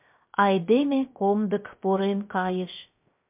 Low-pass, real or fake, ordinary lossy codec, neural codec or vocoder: 3.6 kHz; fake; MP3, 32 kbps; codec, 16 kHz, 0.3 kbps, FocalCodec